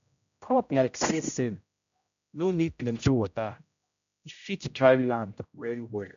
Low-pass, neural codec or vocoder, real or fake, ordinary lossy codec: 7.2 kHz; codec, 16 kHz, 0.5 kbps, X-Codec, HuBERT features, trained on general audio; fake; MP3, 64 kbps